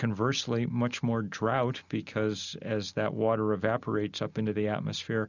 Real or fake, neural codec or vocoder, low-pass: real; none; 7.2 kHz